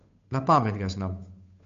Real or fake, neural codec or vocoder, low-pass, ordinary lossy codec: fake; codec, 16 kHz, 8 kbps, FunCodec, trained on Chinese and English, 25 frames a second; 7.2 kHz; MP3, 48 kbps